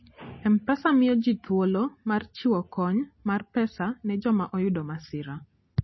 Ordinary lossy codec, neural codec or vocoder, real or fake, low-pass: MP3, 24 kbps; none; real; 7.2 kHz